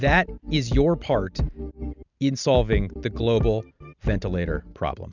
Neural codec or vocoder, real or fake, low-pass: none; real; 7.2 kHz